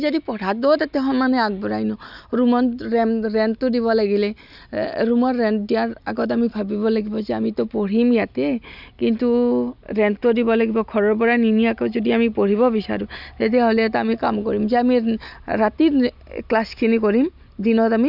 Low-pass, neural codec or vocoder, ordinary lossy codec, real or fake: 5.4 kHz; none; none; real